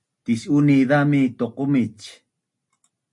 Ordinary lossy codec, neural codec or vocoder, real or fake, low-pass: MP3, 48 kbps; none; real; 10.8 kHz